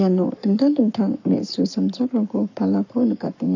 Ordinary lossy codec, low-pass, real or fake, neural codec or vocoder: none; 7.2 kHz; fake; codec, 44.1 kHz, 7.8 kbps, Pupu-Codec